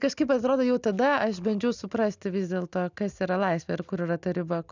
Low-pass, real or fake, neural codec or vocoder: 7.2 kHz; real; none